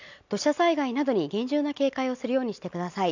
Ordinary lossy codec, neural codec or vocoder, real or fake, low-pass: none; none; real; 7.2 kHz